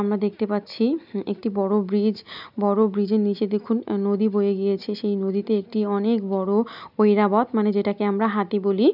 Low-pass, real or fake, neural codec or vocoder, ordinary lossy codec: 5.4 kHz; real; none; none